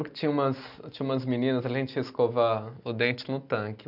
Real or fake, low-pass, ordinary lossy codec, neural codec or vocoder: real; 5.4 kHz; none; none